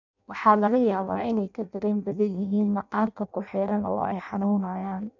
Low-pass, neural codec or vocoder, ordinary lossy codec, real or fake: 7.2 kHz; codec, 16 kHz in and 24 kHz out, 0.6 kbps, FireRedTTS-2 codec; none; fake